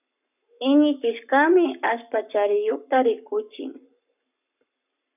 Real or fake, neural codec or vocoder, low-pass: fake; codec, 44.1 kHz, 7.8 kbps, Pupu-Codec; 3.6 kHz